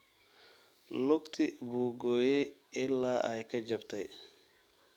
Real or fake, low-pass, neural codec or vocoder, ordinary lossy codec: fake; 19.8 kHz; codec, 44.1 kHz, 7.8 kbps, DAC; none